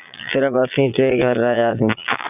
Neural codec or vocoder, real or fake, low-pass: vocoder, 22.05 kHz, 80 mel bands, Vocos; fake; 3.6 kHz